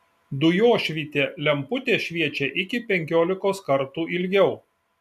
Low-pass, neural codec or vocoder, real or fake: 14.4 kHz; none; real